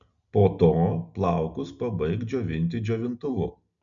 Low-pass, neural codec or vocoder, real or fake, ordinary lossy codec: 7.2 kHz; none; real; MP3, 96 kbps